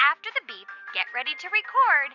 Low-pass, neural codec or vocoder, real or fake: 7.2 kHz; none; real